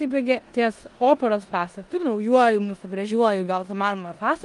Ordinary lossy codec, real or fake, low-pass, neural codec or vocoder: Opus, 32 kbps; fake; 10.8 kHz; codec, 16 kHz in and 24 kHz out, 0.9 kbps, LongCat-Audio-Codec, four codebook decoder